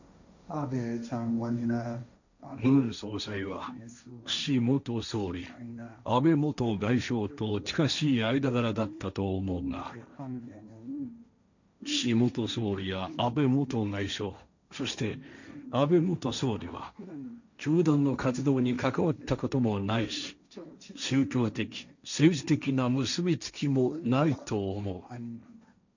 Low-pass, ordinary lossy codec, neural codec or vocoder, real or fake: 7.2 kHz; none; codec, 16 kHz, 1.1 kbps, Voila-Tokenizer; fake